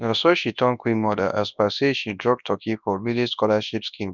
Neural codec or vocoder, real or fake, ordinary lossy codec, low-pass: codec, 24 kHz, 0.9 kbps, WavTokenizer, large speech release; fake; none; 7.2 kHz